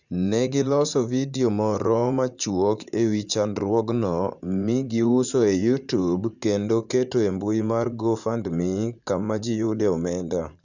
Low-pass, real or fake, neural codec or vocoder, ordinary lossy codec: 7.2 kHz; fake; vocoder, 44.1 kHz, 80 mel bands, Vocos; none